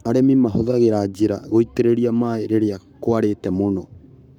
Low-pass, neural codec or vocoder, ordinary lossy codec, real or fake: 19.8 kHz; codec, 44.1 kHz, 7.8 kbps, Pupu-Codec; none; fake